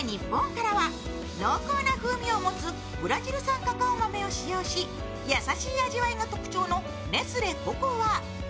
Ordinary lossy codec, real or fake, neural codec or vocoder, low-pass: none; real; none; none